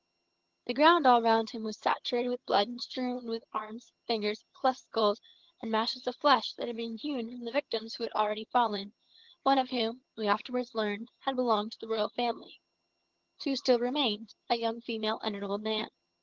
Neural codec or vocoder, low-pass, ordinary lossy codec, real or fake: vocoder, 22.05 kHz, 80 mel bands, HiFi-GAN; 7.2 kHz; Opus, 16 kbps; fake